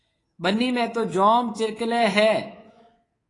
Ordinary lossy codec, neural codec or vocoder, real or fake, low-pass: AAC, 32 kbps; codec, 24 kHz, 3.1 kbps, DualCodec; fake; 10.8 kHz